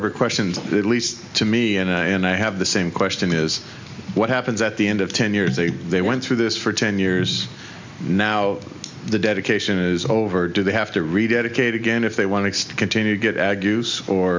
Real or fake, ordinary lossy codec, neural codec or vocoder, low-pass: real; MP3, 64 kbps; none; 7.2 kHz